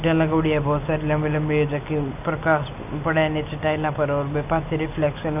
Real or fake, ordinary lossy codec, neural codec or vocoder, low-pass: real; none; none; 3.6 kHz